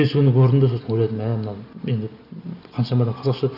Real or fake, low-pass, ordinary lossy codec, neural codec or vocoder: real; 5.4 kHz; none; none